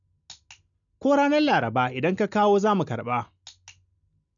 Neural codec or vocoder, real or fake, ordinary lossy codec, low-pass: none; real; MP3, 96 kbps; 7.2 kHz